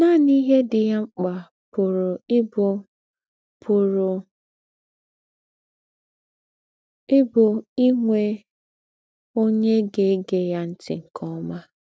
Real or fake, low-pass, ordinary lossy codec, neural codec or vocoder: real; none; none; none